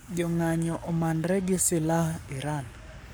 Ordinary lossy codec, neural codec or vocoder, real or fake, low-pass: none; codec, 44.1 kHz, 7.8 kbps, Pupu-Codec; fake; none